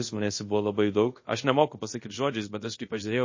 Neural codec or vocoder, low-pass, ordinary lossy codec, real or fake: codec, 24 kHz, 0.5 kbps, DualCodec; 7.2 kHz; MP3, 32 kbps; fake